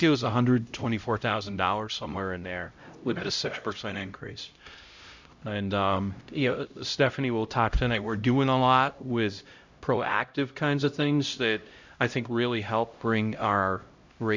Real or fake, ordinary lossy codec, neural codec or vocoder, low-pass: fake; Opus, 64 kbps; codec, 16 kHz, 0.5 kbps, X-Codec, HuBERT features, trained on LibriSpeech; 7.2 kHz